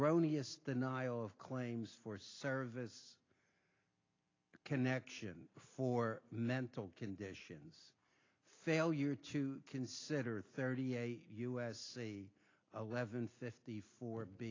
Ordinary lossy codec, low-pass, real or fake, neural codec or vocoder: AAC, 32 kbps; 7.2 kHz; real; none